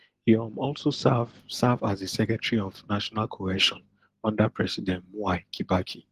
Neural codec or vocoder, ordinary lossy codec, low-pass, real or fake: none; Opus, 16 kbps; 14.4 kHz; real